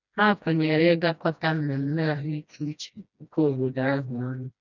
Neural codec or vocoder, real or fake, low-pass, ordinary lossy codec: codec, 16 kHz, 1 kbps, FreqCodec, smaller model; fake; 7.2 kHz; none